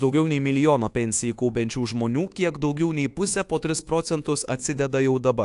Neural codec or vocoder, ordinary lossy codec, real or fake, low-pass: codec, 24 kHz, 1.2 kbps, DualCodec; AAC, 64 kbps; fake; 10.8 kHz